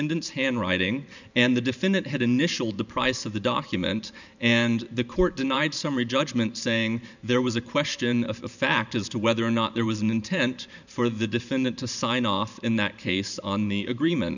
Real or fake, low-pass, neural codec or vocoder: fake; 7.2 kHz; vocoder, 44.1 kHz, 128 mel bands every 512 samples, BigVGAN v2